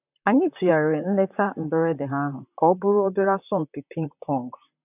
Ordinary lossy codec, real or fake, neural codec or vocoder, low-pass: none; fake; vocoder, 44.1 kHz, 128 mel bands, Pupu-Vocoder; 3.6 kHz